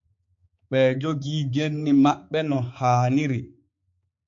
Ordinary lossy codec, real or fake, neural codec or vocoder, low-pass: MP3, 48 kbps; fake; codec, 16 kHz, 4 kbps, X-Codec, HuBERT features, trained on balanced general audio; 7.2 kHz